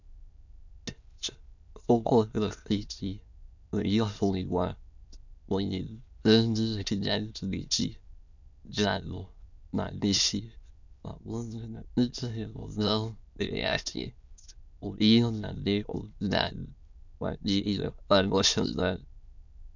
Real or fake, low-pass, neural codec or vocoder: fake; 7.2 kHz; autoencoder, 22.05 kHz, a latent of 192 numbers a frame, VITS, trained on many speakers